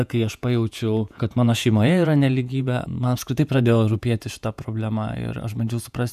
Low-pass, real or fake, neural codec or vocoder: 14.4 kHz; fake; vocoder, 48 kHz, 128 mel bands, Vocos